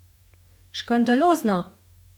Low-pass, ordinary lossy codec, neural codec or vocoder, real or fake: 19.8 kHz; none; codec, 44.1 kHz, 2.6 kbps, DAC; fake